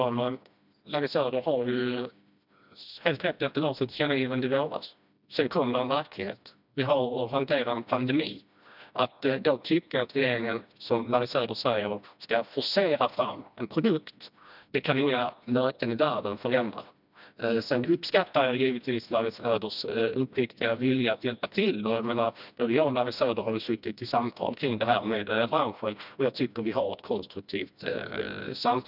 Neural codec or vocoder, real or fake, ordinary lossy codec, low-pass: codec, 16 kHz, 1 kbps, FreqCodec, smaller model; fake; none; 5.4 kHz